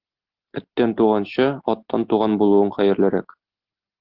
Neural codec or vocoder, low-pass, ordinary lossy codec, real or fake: none; 5.4 kHz; Opus, 16 kbps; real